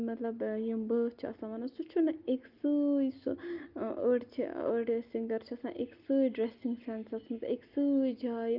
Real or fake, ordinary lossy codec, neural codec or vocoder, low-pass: real; Opus, 24 kbps; none; 5.4 kHz